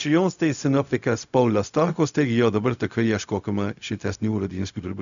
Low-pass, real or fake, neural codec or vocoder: 7.2 kHz; fake; codec, 16 kHz, 0.4 kbps, LongCat-Audio-Codec